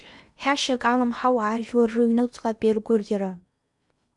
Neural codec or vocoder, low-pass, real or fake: codec, 16 kHz in and 24 kHz out, 0.8 kbps, FocalCodec, streaming, 65536 codes; 10.8 kHz; fake